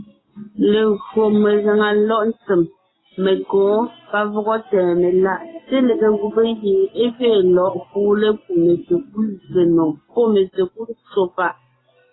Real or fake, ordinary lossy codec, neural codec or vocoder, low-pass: real; AAC, 16 kbps; none; 7.2 kHz